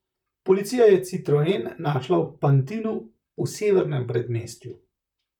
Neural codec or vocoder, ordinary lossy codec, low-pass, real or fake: vocoder, 44.1 kHz, 128 mel bands, Pupu-Vocoder; none; 19.8 kHz; fake